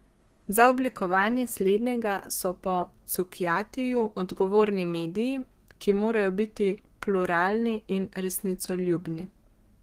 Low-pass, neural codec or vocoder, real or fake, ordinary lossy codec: 14.4 kHz; codec, 32 kHz, 1.9 kbps, SNAC; fake; Opus, 24 kbps